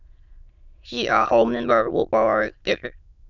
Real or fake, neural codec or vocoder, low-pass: fake; autoencoder, 22.05 kHz, a latent of 192 numbers a frame, VITS, trained on many speakers; 7.2 kHz